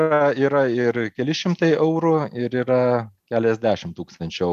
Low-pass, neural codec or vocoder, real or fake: 14.4 kHz; none; real